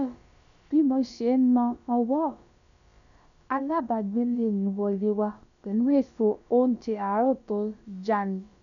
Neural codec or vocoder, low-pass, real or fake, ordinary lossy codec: codec, 16 kHz, about 1 kbps, DyCAST, with the encoder's durations; 7.2 kHz; fake; MP3, 96 kbps